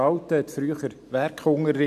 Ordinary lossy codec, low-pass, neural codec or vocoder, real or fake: none; 14.4 kHz; none; real